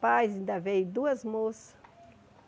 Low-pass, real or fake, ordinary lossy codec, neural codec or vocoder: none; real; none; none